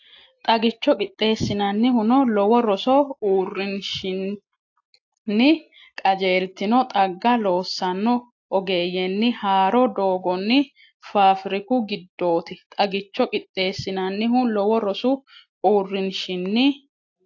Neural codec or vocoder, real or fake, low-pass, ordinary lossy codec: none; real; 7.2 kHz; AAC, 48 kbps